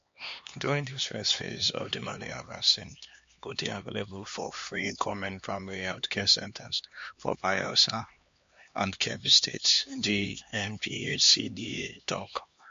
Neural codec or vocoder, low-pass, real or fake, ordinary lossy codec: codec, 16 kHz, 2 kbps, X-Codec, HuBERT features, trained on LibriSpeech; 7.2 kHz; fake; MP3, 48 kbps